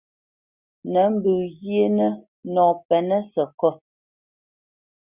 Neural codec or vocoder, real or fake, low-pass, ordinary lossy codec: none; real; 3.6 kHz; Opus, 64 kbps